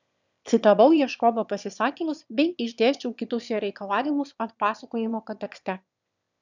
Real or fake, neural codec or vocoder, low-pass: fake; autoencoder, 22.05 kHz, a latent of 192 numbers a frame, VITS, trained on one speaker; 7.2 kHz